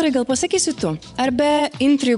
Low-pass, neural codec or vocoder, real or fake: 10.8 kHz; vocoder, 24 kHz, 100 mel bands, Vocos; fake